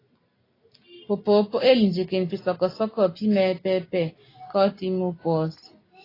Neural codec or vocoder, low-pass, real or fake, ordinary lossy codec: none; 5.4 kHz; real; AAC, 24 kbps